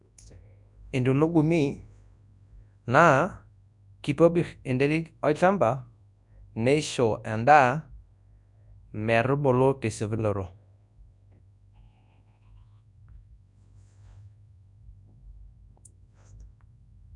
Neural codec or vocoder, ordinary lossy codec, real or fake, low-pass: codec, 24 kHz, 0.9 kbps, WavTokenizer, large speech release; none; fake; 10.8 kHz